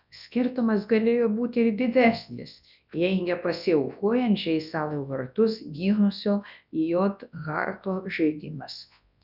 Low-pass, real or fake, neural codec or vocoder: 5.4 kHz; fake; codec, 24 kHz, 0.9 kbps, WavTokenizer, large speech release